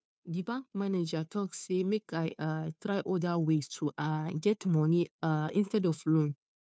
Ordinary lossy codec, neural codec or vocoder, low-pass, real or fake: none; codec, 16 kHz, 2 kbps, FunCodec, trained on Chinese and English, 25 frames a second; none; fake